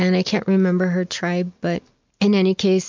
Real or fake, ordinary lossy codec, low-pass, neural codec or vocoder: real; MP3, 64 kbps; 7.2 kHz; none